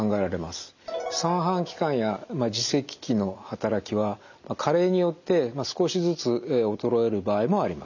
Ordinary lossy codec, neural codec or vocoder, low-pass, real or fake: none; none; 7.2 kHz; real